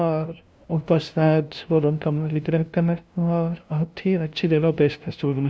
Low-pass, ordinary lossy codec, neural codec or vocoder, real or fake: none; none; codec, 16 kHz, 0.5 kbps, FunCodec, trained on LibriTTS, 25 frames a second; fake